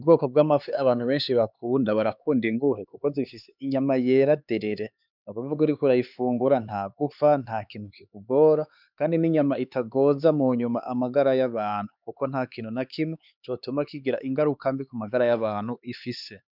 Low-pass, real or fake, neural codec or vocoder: 5.4 kHz; fake; codec, 16 kHz, 4 kbps, X-Codec, HuBERT features, trained on LibriSpeech